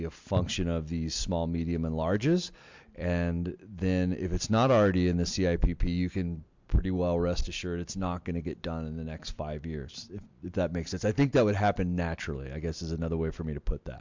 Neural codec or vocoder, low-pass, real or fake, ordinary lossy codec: none; 7.2 kHz; real; MP3, 64 kbps